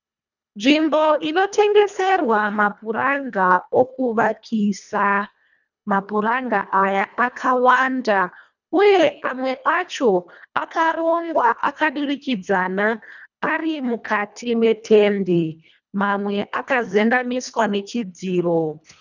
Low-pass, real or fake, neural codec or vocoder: 7.2 kHz; fake; codec, 24 kHz, 1.5 kbps, HILCodec